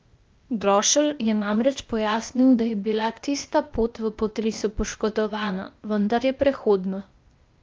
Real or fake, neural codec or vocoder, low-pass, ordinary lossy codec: fake; codec, 16 kHz, 0.8 kbps, ZipCodec; 7.2 kHz; Opus, 24 kbps